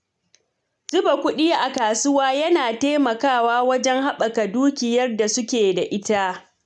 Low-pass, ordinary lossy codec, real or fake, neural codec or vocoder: 10.8 kHz; none; real; none